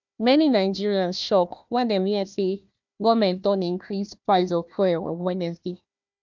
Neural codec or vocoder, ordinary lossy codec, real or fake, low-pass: codec, 16 kHz, 1 kbps, FunCodec, trained on Chinese and English, 50 frames a second; MP3, 64 kbps; fake; 7.2 kHz